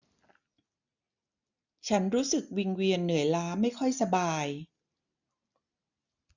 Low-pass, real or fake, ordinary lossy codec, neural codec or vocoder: 7.2 kHz; real; none; none